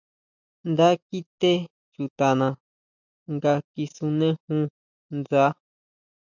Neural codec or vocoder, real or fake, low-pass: none; real; 7.2 kHz